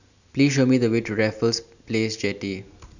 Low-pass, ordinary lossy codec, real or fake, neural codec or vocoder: 7.2 kHz; none; real; none